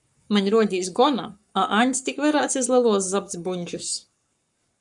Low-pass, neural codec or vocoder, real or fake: 10.8 kHz; codec, 44.1 kHz, 7.8 kbps, Pupu-Codec; fake